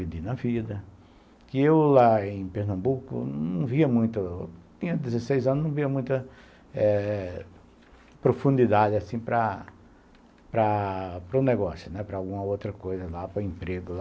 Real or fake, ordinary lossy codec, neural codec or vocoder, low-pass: real; none; none; none